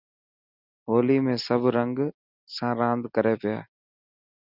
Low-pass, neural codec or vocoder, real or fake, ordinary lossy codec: 5.4 kHz; none; real; Opus, 64 kbps